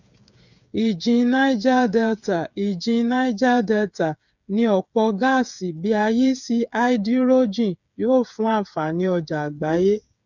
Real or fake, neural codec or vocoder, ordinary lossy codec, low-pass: fake; codec, 16 kHz, 8 kbps, FreqCodec, smaller model; none; 7.2 kHz